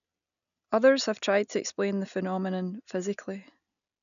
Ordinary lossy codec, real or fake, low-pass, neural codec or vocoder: MP3, 96 kbps; real; 7.2 kHz; none